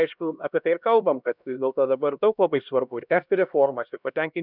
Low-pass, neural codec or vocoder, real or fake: 5.4 kHz; codec, 16 kHz, 1 kbps, X-Codec, HuBERT features, trained on LibriSpeech; fake